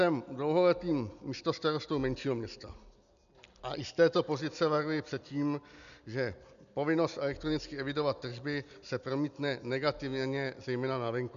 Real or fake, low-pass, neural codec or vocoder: real; 7.2 kHz; none